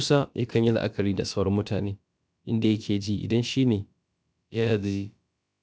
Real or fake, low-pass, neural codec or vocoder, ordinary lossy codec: fake; none; codec, 16 kHz, about 1 kbps, DyCAST, with the encoder's durations; none